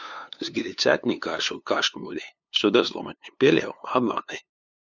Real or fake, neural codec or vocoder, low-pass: fake; codec, 16 kHz, 2 kbps, FunCodec, trained on LibriTTS, 25 frames a second; 7.2 kHz